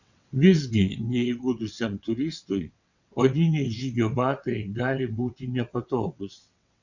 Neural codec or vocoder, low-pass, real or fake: vocoder, 22.05 kHz, 80 mel bands, WaveNeXt; 7.2 kHz; fake